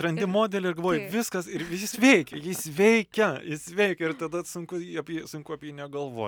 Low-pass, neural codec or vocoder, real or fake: 19.8 kHz; none; real